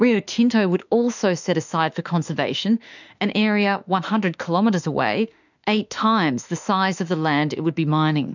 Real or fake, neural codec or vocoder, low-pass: fake; autoencoder, 48 kHz, 32 numbers a frame, DAC-VAE, trained on Japanese speech; 7.2 kHz